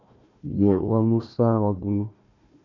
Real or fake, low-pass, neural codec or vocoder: fake; 7.2 kHz; codec, 16 kHz, 1 kbps, FunCodec, trained on Chinese and English, 50 frames a second